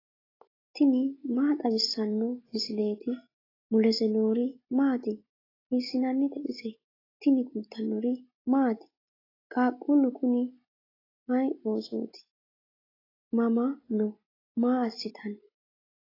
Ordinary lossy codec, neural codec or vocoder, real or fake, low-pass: AAC, 24 kbps; none; real; 5.4 kHz